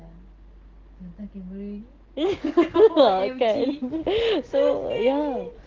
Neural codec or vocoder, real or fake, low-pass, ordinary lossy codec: none; real; 7.2 kHz; Opus, 16 kbps